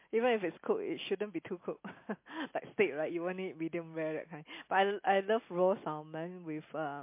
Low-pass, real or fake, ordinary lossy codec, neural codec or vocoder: 3.6 kHz; real; MP3, 24 kbps; none